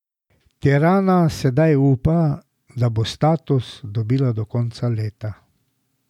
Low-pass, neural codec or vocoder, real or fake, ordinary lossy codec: 19.8 kHz; none; real; none